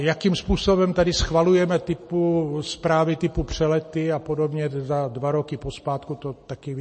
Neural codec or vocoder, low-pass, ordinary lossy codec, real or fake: none; 9.9 kHz; MP3, 32 kbps; real